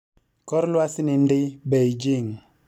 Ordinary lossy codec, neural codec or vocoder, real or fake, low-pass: none; none; real; 19.8 kHz